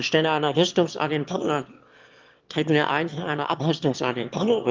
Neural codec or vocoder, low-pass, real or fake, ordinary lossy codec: autoencoder, 22.05 kHz, a latent of 192 numbers a frame, VITS, trained on one speaker; 7.2 kHz; fake; Opus, 32 kbps